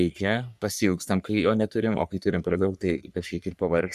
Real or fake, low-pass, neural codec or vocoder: fake; 14.4 kHz; codec, 44.1 kHz, 3.4 kbps, Pupu-Codec